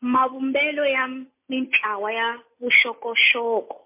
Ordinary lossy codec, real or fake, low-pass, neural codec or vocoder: MP3, 24 kbps; real; 3.6 kHz; none